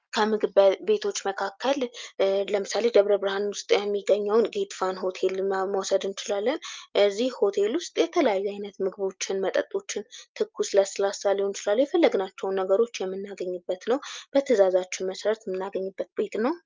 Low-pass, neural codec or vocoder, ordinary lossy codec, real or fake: 7.2 kHz; none; Opus, 24 kbps; real